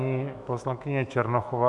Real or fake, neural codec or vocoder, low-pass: fake; autoencoder, 48 kHz, 128 numbers a frame, DAC-VAE, trained on Japanese speech; 10.8 kHz